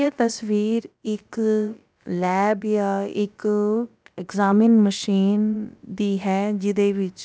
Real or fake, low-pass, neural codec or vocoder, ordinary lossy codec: fake; none; codec, 16 kHz, about 1 kbps, DyCAST, with the encoder's durations; none